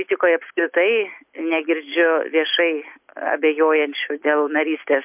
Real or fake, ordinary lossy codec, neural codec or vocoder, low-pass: real; MP3, 32 kbps; none; 3.6 kHz